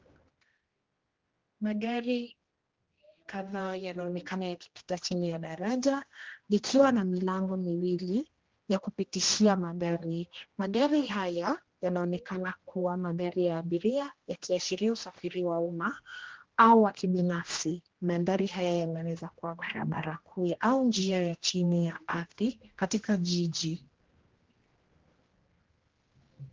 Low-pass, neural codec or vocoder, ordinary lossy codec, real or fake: 7.2 kHz; codec, 16 kHz, 1 kbps, X-Codec, HuBERT features, trained on general audio; Opus, 16 kbps; fake